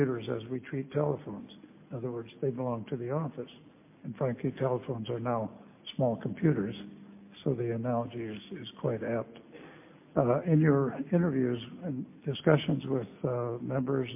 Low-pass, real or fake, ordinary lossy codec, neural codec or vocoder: 3.6 kHz; real; MP3, 24 kbps; none